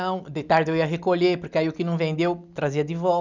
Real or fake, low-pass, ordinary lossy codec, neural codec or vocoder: real; 7.2 kHz; none; none